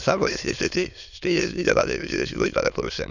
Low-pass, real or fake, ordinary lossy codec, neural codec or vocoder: 7.2 kHz; fake; none; autoencoder, 22.05 kHz, a latent of 192 numbers a frame, VITS, trained on many speakers